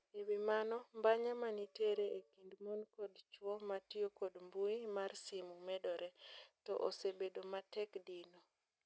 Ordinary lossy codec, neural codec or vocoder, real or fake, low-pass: none; none; real; none